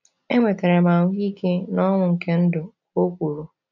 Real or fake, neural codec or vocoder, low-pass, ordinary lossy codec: real; none; 7.2 kHz; none